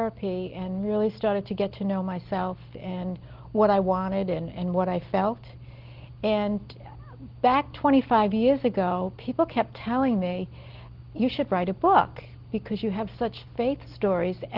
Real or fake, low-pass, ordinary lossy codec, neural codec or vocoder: real; 5.4 kHz; Opus, 16 kbps; none